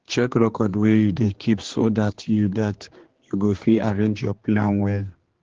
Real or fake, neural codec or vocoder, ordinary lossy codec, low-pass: fake; codec, 16 kHz, 2 kbps, X-Codec, HuBERT features, trained on general audio; Opus, 16 kbps; 7.2 kHz